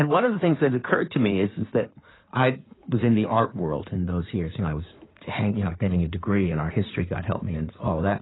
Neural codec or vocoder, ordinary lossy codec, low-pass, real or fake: codec, 16 kHz in and 24 kHz out, 2.2 kbps, FireRedTTS-2 codec; AAC, 16 kbps; 7.2 kHz; fake